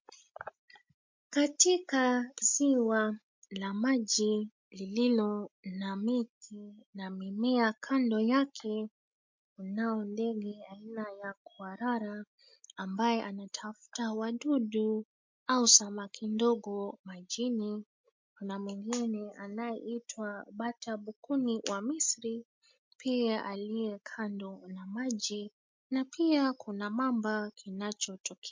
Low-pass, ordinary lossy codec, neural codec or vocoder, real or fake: 7.2 kHz; MP3, 48 kbps; none; real